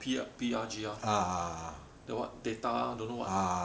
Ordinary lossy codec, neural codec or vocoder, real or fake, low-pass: none; none; real; none